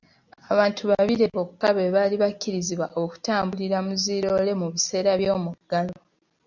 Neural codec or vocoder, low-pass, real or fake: none; 7.2 kHz; real